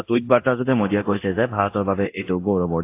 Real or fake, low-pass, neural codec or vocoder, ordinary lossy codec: fake; 3.6 kHz; codec, 24 kHz, 0.9 kbps, DualCodec; AAC, 24 kbps